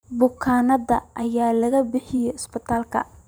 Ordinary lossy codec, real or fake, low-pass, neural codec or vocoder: none; fake; none; vocoder, 44.1 kHz, 128 mel bands every 512 samples, BigVGAN v2